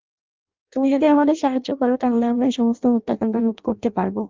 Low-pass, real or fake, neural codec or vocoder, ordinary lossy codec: 7.2 kHz; fake; codec, 16 kHz in and 24 kHz out, 0.6 kbps, FireRedTTS-2 codec; Opus, 32 kbps